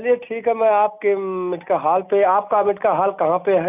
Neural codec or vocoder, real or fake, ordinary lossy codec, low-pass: none; real; none; 3.6 kHz